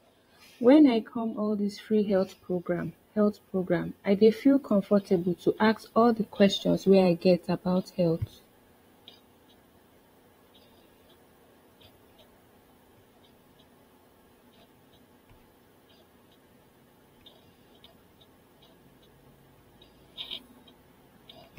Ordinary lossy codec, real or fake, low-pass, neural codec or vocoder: AAC, 48 kbps; fake; 19.8 kHz; vocoder, 44.1 kHz, 128 mel bands every 512 samples, BigVGAN v2